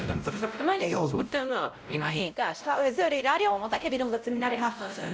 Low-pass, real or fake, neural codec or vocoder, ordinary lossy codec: none; fake; codec, 16 kHz, 0.5 kbps, X-Codec, WavLM features, trained on Multilingual LibriSpeech; none